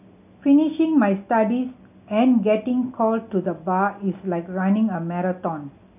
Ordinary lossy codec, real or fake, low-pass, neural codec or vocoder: none; real; 3.6 kHz; none